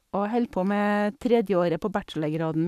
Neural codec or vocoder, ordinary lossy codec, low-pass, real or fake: codec, 44.1 kHz, 7.8 kbps, Pupu-Codec; none; 14.4 kHz; fake